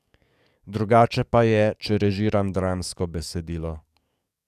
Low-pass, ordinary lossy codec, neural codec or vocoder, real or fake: 14.4 kHz; none; codec, 44.1 kHz, 7.8 kbps, DAC; fake